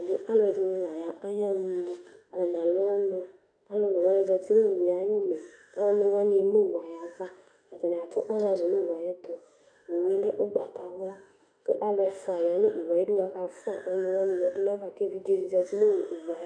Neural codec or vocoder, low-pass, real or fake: autoencoder, 48 kHz, 32 numbers a frame, DAC-VAE, trained on Japanese speech; 9.9 kHz; fake